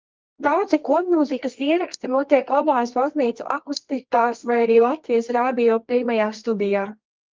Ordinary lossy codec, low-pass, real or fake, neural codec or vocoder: Opus, 32 kbps; 7.2 kHz; fake; codec, 24 kHz, 0.9 kbps, WavTokenizer, medium music audio release